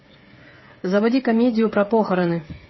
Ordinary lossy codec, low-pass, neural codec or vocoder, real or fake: MP3, 24 kbps; 7.2 kHz; codec, 16 kHz, 8 kbps, FreqCodec, smaller model; fake